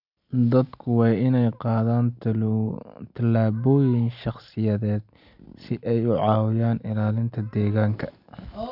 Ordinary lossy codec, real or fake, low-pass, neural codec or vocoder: none; real; 5.4 kHz; none